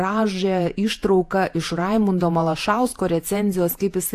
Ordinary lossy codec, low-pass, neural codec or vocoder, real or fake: AAC, 64 kbps; 14.4 kHz; vocoder, 48 kHz, 128 mel bands, Vocos; fake